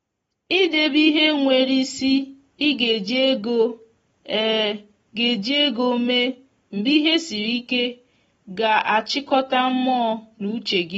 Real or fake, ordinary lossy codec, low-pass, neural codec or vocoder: real; AAC, 24 kbps; 19.8 kHz; none